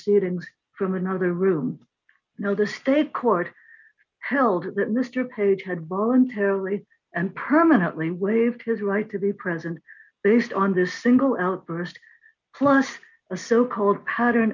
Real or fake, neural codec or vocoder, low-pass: real; none; 7.2 kHz